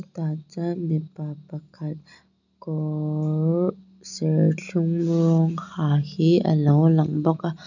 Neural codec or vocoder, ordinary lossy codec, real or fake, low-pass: none; none; real; 7.2 kHz